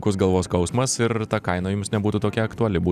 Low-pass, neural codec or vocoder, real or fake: 14.4 kHz; none; real